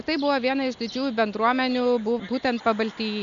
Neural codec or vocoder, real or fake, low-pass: none; real; 7.2 kHz